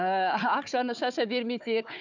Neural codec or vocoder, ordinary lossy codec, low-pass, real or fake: codec, 16 kHz, 4 kbps, FunCodec, trained on Chinese and English, 50 frames a second; none; 7.2 kHz; fake